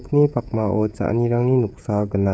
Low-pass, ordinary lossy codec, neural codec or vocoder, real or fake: none; none; codec, 16 kHz, 16 kbps, FreqCodec, smaller model; fake